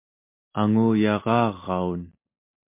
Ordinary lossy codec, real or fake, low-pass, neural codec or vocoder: MP3, 24 kbps; real; 3.6 kHz; none